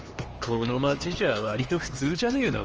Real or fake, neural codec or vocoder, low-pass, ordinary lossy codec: fake; codec, 16 kHz, 2 kbps, X-Codec, HuBERT features, trained on LibriSpeech; 7.2 kHz; Opus, 24 kbps